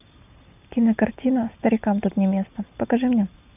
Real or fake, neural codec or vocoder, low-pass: real; none; 3.6 kHz